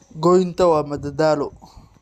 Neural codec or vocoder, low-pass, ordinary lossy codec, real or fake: none; 14.4 kHz; Opus, 64 kbps; real